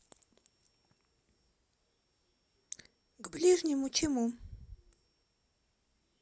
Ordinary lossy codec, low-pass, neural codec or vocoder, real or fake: none; none; none; real